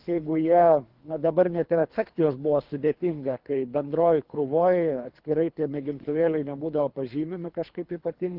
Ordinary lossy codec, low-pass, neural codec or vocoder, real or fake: Opus, 24 kbps; 5.4 kHz; codec, 24 kHz, 3 kbps, HILCodec; fake